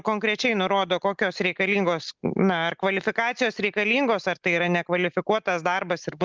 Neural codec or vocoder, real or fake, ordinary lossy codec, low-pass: none; real; Opus, 32 kbps; 7.2 kHz